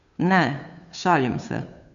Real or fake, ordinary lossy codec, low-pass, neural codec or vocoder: fake; none; 7.2 kHz; codec, 16 kHz, 2 kbps, FunCodec, trained on Chinese and English, 25 frames a second